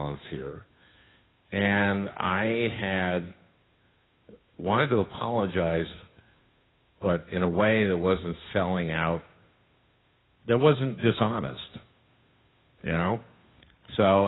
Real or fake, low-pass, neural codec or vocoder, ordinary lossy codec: fake; 7.2 kHz; codec, 16 kHz, 2 kbps, FunCodec, trained on Chinese and English, 25 frames a second; AAC, 16 kbps